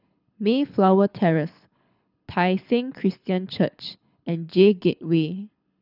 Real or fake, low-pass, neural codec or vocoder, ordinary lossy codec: fake; 5.4 kHz; codec, 24 kHz, 6 kbps, HILCodec; none